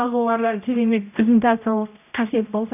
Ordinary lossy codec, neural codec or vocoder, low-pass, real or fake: none; codec, 16 kHz, 0.5 kbps, X-Codec, HuBERT features, trained on general audio; 3.6 kHz; fake